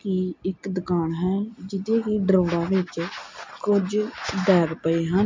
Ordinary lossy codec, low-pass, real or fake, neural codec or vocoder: MP3, 48 kbps; 7.2 kHz; real; none